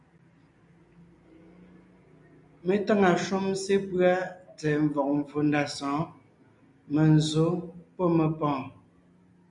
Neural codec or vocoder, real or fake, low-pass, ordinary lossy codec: none; real; 10.8 kHz; MP3, 96 kbps